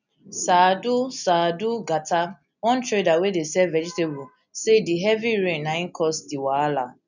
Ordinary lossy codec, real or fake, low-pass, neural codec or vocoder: none; real; 7.2 kHz; none